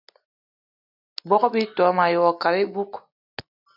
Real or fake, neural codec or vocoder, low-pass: real; none; 5.4 kHz